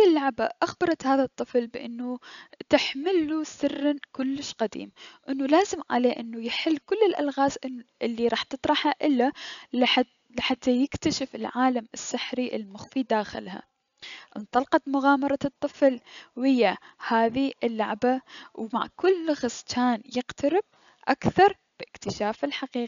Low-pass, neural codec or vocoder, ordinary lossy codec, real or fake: 7.2 kHz; none; none; real